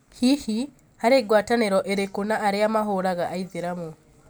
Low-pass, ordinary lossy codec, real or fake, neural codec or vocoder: none; none; real; none